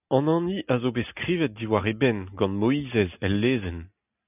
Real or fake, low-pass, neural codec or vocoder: real; 3.6 kHz; none